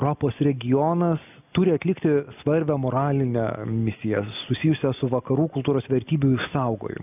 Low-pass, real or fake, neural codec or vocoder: 3.6 kHz; real; none